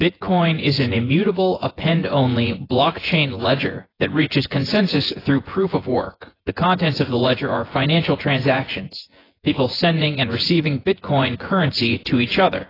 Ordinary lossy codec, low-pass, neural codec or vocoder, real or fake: AAC, 24 kbps; 5.4 kHz; vocoder, 24 kHz, 100 mel bands, Vocos; fake